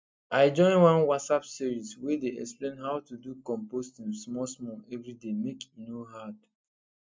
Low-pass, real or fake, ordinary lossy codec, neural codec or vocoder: none; real; none; none